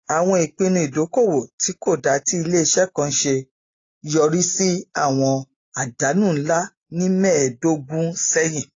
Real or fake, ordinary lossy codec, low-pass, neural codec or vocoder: real; AAC, 32 kbps; 7.2 kHz; none